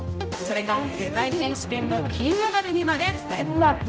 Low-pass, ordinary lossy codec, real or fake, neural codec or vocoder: none; none; fake; codec, 16 kHz, 0.5 kbps, X-Codec, HuBERT features, trained on general audio